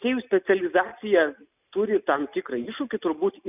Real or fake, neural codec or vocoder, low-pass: real; none; 3.6 kHz